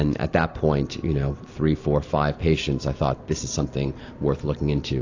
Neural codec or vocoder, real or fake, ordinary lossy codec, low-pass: none; real; AAC, 48 kbps; 7.2 kHz